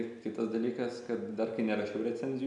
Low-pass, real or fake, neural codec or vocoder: 10.8 kHz; real; none